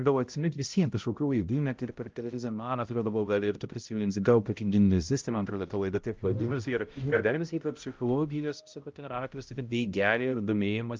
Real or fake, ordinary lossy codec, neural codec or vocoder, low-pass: fake; Opus, 24 kbps; codec, 16 kHz, 0.5 kbps, X-Codec, HuBERT features, trained on balanced general audio; 7.2 kHz